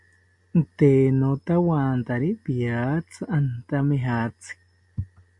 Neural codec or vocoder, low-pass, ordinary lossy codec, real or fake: none; 10.8 kHz; MP3, 64 kbps; real